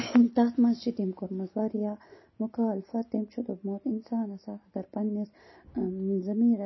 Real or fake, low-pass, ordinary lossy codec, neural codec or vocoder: real; 7.2 kHz; MP3, 24 kbps; none